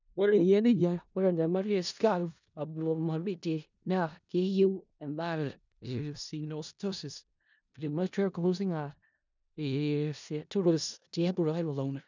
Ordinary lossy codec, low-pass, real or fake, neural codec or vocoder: none; 7.2 kHz; fake; codec, 16 kHz in and 24 kHz out, 0.4 kbps, LongCat-Audio-Codec, four codebook decoder